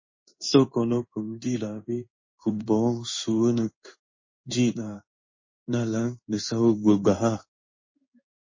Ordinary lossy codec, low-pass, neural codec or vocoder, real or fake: MP3, 32 kbps; 7.2 kHz; codec, 16 kHz in and 24 kHz out, 1 kbps, XY-Tokenizer; fake